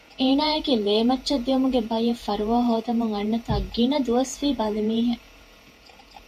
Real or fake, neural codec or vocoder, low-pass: fake; vocoder, 48 kHz, 128 mel bands, Vocos; 14.4 kHz